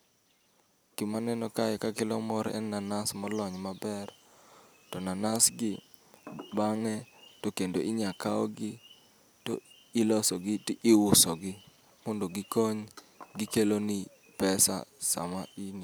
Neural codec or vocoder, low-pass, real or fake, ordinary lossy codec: none; none; real; none